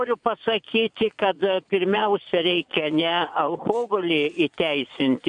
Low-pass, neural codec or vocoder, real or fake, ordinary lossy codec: 9.9 kHz; vocoder, 22.05 kHz, 80 mel bands, Vocos; fake; MP3, 96 kbps